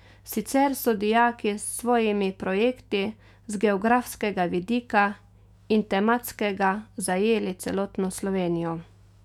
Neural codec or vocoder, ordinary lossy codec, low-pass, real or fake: autoencoder, 48 kHz, 128 numbers a frame, DAC-VAE, trained on Japanese speech; none; 19.8 kHz; fake